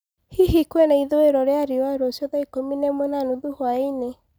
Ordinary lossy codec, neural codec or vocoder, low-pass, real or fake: none; none; none; real